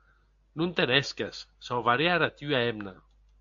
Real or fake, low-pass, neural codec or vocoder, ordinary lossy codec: real; 7.2 kHz; none; AAC, 64 kbps